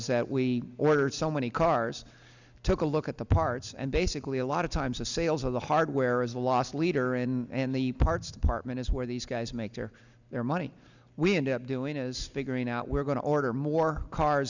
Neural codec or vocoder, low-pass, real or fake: none; 7.2 kHz; real